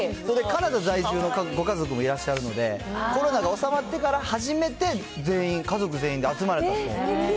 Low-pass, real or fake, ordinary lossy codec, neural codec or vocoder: none; real; none; none